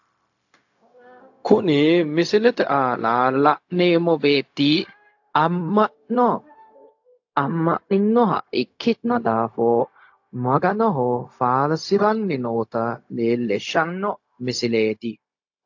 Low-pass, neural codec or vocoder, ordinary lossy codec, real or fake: 7.2 kHz; codec, 16 kHz, 0.4 kbps, LongCat-Audio-Codec; AAC, 48 kbps; fake